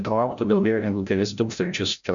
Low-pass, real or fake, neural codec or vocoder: 7.2 kHz; fake; codec, 16 kHz, 0.5 kbps, FreqCodec, larger model